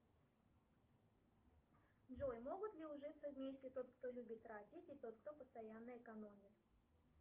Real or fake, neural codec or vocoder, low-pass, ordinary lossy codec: real; none; 3.6 kHz; Opus, 24 kbps